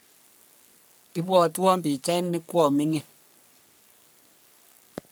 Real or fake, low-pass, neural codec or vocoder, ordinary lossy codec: fake; none; codec, 44.1 kHz, 3.4 kbps, Pupu-Codec; none